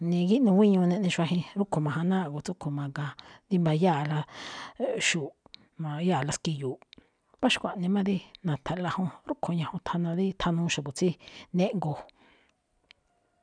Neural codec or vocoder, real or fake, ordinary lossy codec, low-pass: none; real; none; 9.9 kHz